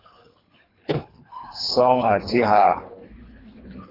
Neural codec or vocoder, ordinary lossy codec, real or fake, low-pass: codec, 24 kHz, 3 kbps, HILCodec; AAC, 24 kbps; fake; 5.4 kHz